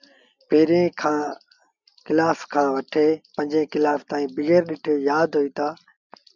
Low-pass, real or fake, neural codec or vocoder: 7.2 kHz; real; none